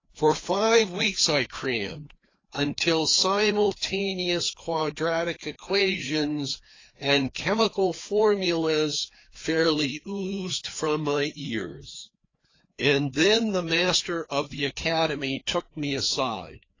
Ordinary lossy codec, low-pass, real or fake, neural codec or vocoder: AAC, 32 kbps; 7.2 kHz; fake; codec, 16 kHz, 2 kbps, FreqCodec, larger model